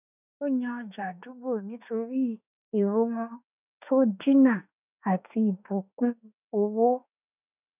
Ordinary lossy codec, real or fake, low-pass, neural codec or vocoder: none; fake; 3.6 kHz; autoencoder, 48 kHz, 32 numbers a frame, DAC-VAE, trained on Japanese speech